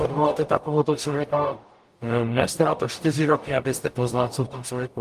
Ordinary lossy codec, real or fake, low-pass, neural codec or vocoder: Opus, 32 kbps; fake; 14.4 kHz; codec, 44.1 kHz, 0.9 kbps, DAC